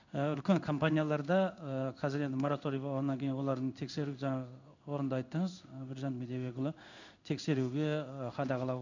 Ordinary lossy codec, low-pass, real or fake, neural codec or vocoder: none; 7.2 kHz; fake; codec, 16 kHz in and 24 kHz out, 1 kbps, XY-Tokenizer